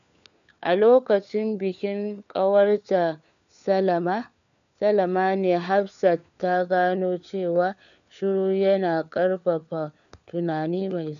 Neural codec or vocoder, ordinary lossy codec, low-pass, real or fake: codec, 16 kHz, 4 kbps, FunCodec, trained on LibriTTS, 50 frames a second; none; 7.2 kHz; fake